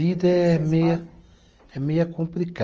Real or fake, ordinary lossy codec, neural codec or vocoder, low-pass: real; Opus, 24 kbps; none; 7.2 kHz